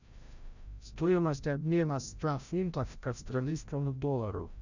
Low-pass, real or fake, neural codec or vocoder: 7.2 kHz; fake; codec, 16 kHz, 0.5 kbps, FreqCodec, larger model